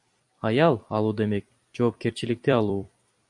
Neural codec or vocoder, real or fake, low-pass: vocoder, 44.1 kHz, 128 mel bands every 256 samples, BigVGAN v2; fake; 10.8 kHz